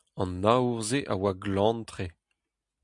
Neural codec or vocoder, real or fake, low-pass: none; real; 10.8 kHz